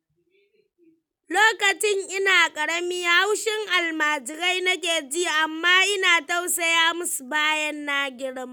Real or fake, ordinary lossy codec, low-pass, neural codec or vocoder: real; none; none; none